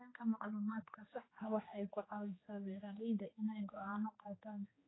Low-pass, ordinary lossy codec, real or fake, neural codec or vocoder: 7.2 kHz; AAC, 16 kbps; fake; codec, 16 kHz, 2 kbps, X-Codec, HuBERT features, trained on general audio